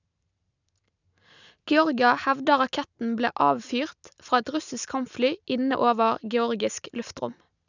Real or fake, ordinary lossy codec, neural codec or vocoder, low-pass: real; none; none; 7.2 kHz